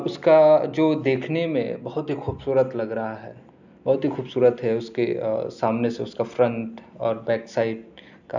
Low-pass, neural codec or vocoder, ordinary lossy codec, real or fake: 7.2 kHz; none; none; real